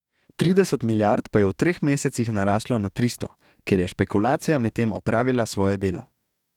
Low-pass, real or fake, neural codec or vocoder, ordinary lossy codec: 19.8 kHz; fake; codec, 44.1 kHz, 2.6 kbps, DAC; none